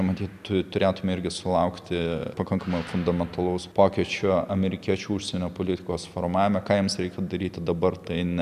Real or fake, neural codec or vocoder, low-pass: real; none; 14.4 kHz